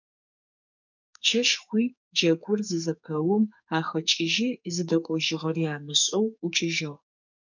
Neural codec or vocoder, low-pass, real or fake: codec, 44.1 kHz, 2.6 kbps, SNAC; 7.2 kHz; fake